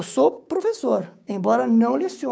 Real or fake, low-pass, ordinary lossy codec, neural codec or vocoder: fake; none; none; codec, 16 kHz, 6 kbps, DAC